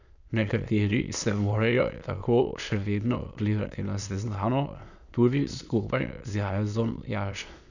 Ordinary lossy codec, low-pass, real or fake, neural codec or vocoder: none; 7.2 kHz; fake; autoencoder, 22.05 kHz, a latent of 192 numbers a frame, VITS, trained on many speakers